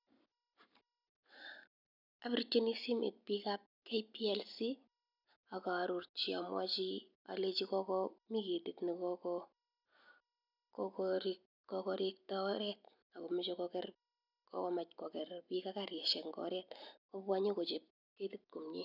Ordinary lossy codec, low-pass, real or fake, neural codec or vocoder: none; 5.4 kHz; real; none